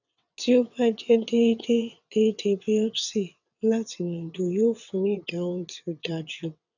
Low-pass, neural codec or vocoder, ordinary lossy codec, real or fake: 7.2 kHz; vocoder, 22.05 kHz, 80 mel bands, WaveNeXt; none; fake